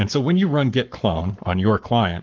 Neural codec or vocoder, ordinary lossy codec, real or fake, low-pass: codec, 44.1 kHz, 7.8 kbps, Pupu-Codec; Opus, 32 kbps; fake; 7.2 kHz